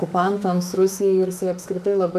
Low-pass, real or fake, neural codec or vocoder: 14.4 kHz; fake; autoencoder, 48 kHz, 32 numbers a frame, DAC-VAE, trained on Japanese speech